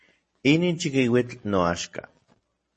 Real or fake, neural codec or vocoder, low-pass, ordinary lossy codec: real; none; 10.8 kHz; MP3, 32 kbps